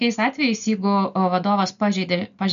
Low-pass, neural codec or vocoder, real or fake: 7.2 kHz; none; real